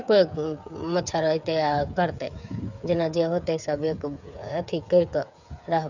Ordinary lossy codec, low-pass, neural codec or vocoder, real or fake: none; 7.2 kHz; codec, 16 kHz, 8 kbps, FreqCodec, smaller model; fake